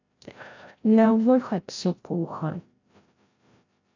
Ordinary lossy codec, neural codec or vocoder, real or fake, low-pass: AAC, 48 kbps; codec, 16 kHz, 0.5 kbps, FreqCodec, larger model; fake; 7.2 kHz